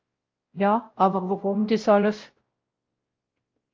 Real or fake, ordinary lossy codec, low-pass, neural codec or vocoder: fake; Opus, 24 kbps; 7.2 kHz; codec, 16 kHz, 0.5 kbps, X-Codec, WavLM features, trained on Multilingual LibriSpeech